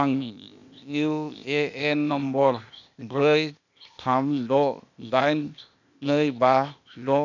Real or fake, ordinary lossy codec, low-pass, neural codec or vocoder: fake; none; 7.2 kHz; codec, 16 kHz, 0.8 kbps, ZipCodec